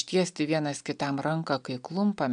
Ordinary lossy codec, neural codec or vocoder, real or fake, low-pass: MP3, 96 kbps; none; real; 9.9 kHz